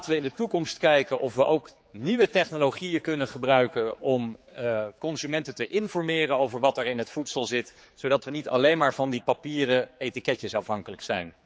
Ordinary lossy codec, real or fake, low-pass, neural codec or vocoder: none; fake; none; codec, 16 kHz, 4 kbps, X-Codec, HuBERT features, trained on general audio